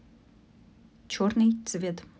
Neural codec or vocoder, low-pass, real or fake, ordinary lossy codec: none; none; real; none